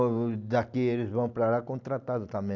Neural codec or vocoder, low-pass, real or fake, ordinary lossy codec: none; 7.2 kHz; real; none